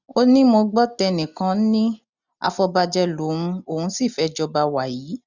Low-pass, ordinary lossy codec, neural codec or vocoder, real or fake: 7.2 kHz; none; none; real